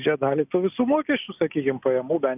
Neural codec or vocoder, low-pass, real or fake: none; 3.6 kHz; real